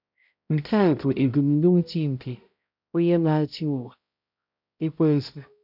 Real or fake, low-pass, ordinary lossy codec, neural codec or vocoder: fake; 5.4 kHz; none; codec, 16 kHz, 0.5 kbps, X-Codec, HuBERT features, trained on balanced general audio